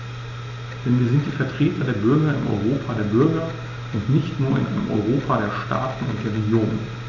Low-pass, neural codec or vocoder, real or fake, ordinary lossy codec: 7.2 kHz; none; real; none